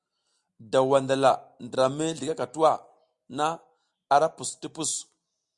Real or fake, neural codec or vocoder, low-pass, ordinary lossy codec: real; none; 10.8 kHz; Opus, 64 kbps